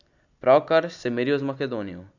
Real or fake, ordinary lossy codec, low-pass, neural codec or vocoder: real; none; 7.2 kHz; none